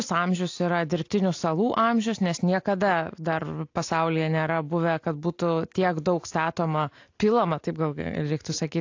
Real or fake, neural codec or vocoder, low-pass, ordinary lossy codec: real; none; 7.2 kHz; AAC, 48 kbps